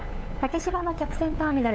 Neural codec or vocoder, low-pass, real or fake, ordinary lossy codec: codec, 16 kHz, 8 kbps, FunCodec, trained on LibriTTS, 25 frames a second; none; fake; none